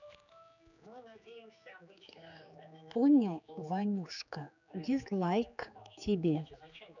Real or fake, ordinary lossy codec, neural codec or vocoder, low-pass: fake; none; codec, 16 kHz, 4 kbps, X-Codec, HuBERT features, trained on general audio; 7.2 kHz